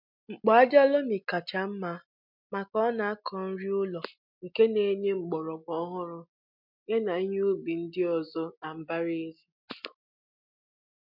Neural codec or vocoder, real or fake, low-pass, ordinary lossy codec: none; real; 5.4 kHz; MP3, 48 kbps